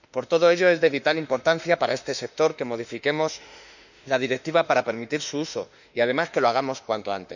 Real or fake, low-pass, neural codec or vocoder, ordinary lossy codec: fake; 7.2 kHz; autoencoder, 48 kHz, 32 numbers a frame, DAC-VAE, trained on Japanese speech; none